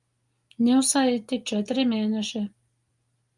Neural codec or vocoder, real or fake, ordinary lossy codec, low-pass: none; real; Opus, 32 kbps; 10.8 kHz